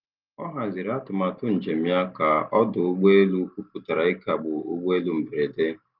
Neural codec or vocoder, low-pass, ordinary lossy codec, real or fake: none; 5.4 kHz; Opus, 24 kbps; real